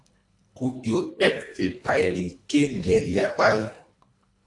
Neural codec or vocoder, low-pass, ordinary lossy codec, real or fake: codec, 24 kHz, 1.5 kbps, HILCodec; 10.8 kHz; AAC, 48 kbps; fake